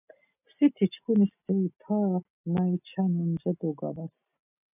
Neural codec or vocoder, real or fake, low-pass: none; real; 3.6 kHz